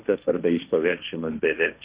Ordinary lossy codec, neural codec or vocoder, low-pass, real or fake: Opus, 64 kbps; codec, 16 kHz, 1.1 kbps, Voila-Tokenizer; 3.6 kHz; fake